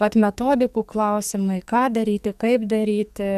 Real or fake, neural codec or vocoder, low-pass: fake; codec, 44.1 kHz, 2.6 kbps, SNAC; 14.4 kHz